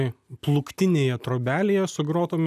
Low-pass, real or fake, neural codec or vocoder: 14.4 kHz; real; none